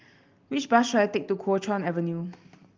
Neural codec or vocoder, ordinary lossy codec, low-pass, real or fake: none; Opus, 24 kbps; 7.2 kHz; real